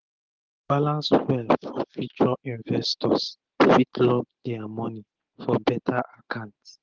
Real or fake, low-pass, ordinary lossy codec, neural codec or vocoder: real; 7.2 kHz; Opus, 16 kbps; none